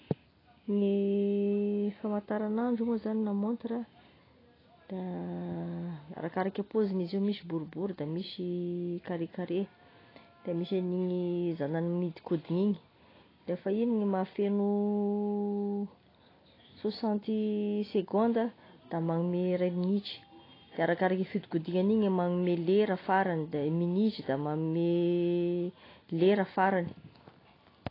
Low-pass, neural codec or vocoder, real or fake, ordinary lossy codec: 5.4 kHz; none; real; AAC, 24 kbps